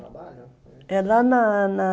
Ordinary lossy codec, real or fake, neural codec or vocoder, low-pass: none; real; none; none